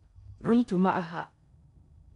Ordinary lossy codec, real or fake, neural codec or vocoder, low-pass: none; fake; codec, 16 kHz in and 24 kHz out, 0.6 kbps, FocalCodec, streaming, 4096 codes; 10.8 kHz